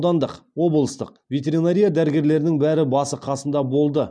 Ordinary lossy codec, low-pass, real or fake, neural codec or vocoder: none; 9.9 kHz; real; none